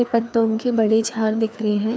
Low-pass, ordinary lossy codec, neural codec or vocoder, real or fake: none; none; codec, 16 kHz, 2 kbps, FreqCodec, larger model; fake